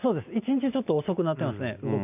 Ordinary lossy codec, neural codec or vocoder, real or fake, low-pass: none; none; real; 3.6 kHz